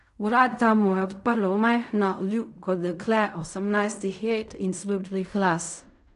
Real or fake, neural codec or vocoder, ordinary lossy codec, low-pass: fake; codec, 16 kHz in and 24 kHz out, 0.4 kbps, LongCat-Audio-Codec, fine tuned four codebook decoder; none; 10.8 kHz